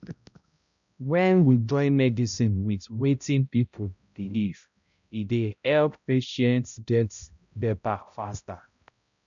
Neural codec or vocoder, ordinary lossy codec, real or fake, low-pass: codec, 16 kHz, 0.5 kbps, X-Codec, HuBERT features, trained on balanced general audio; none; fake; 7.2 kHz